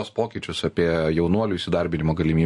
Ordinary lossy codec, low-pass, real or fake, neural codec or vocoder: MP3, 48 kbps; 10.8 kHz; real; none